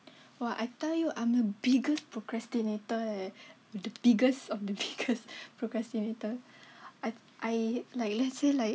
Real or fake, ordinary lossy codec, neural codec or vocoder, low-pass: real; none; none; none